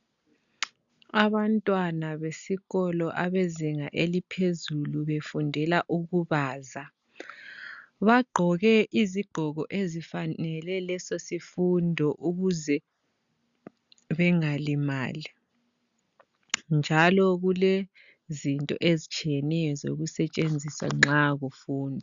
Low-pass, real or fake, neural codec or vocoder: 7.2 kHz; real; none